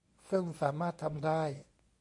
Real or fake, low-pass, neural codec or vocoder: fake; 10.8 kHz; codec, 24 kHz, 0.9 kbps, WavTokenizer, medium speech release version 1